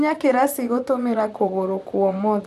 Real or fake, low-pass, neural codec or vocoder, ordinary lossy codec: fake; 14.4 kHz; vocoder, 44.1 kHz, 128 mel bands, Pupu-Vocoder; none